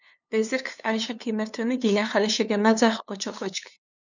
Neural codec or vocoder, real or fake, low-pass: codec, 16 kHz, 2 kbps, FunCodec, trained on LibriTTS, 25 frames a second; fake; 7.2 kHz